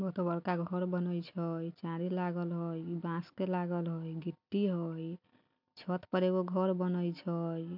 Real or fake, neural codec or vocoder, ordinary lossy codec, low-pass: real; none; MP3, 48 kbps; 5.4 kHz